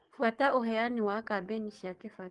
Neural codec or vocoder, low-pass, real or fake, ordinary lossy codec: codec, 44.1 kHz, 7.8 kbps, DAC; 10.8 kHz; fake; Opus, 24 kbps